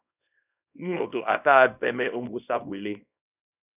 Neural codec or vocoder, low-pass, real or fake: codec, 24 kHz, 0.9 kbps, WavTokenizer, small release; 3.6 kHz; fake